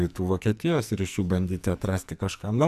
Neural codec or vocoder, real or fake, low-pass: codec, 44.1 kHz, 2.6 kbps, SNAC; fake; 14.4 kHz